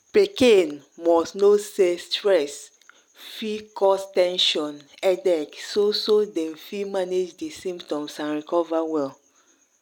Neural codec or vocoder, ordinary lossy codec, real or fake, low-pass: none; none; real; 19.8 kHz